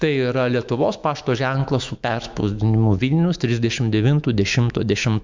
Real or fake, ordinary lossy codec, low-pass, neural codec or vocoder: fake; MP3, 64 kbps; 7.2 kHz; autoencoder, 48 kHz, 128 numbers a frame, DAC-VAE, trained on Japanese speech